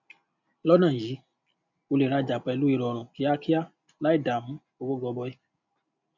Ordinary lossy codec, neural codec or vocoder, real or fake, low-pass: none; none; real; 7.2 kHz